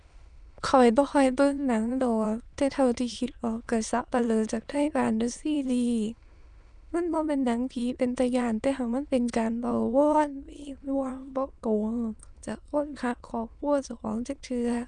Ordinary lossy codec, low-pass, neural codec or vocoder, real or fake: none; 9.9 kHz; autoencoder, 22.05 kHz, a latent of 192 numbers a frame, VITS, trained on many speakers; fake